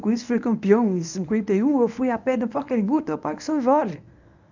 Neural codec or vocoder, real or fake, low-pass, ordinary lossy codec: codec, 24 kHz, 0.9 kbps, WavTokenizer, medium speech release version 1; fake; 7.2 kHz; none